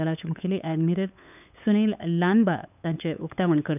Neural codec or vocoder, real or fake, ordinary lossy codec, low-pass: codec, 16 kHz, 8 kbps, FunCodec, trained on LibriTTS, 25 frames a second; fake; none; 3.6 kHz